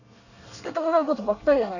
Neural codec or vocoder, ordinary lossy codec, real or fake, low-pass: codec, 24 kHz, 1 kbps, SNAC; none; fake; 7.2 kHz